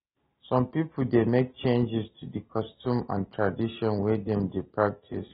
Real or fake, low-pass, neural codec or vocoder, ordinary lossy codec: real; 10.8 kHz; none; AAC, 16 kbps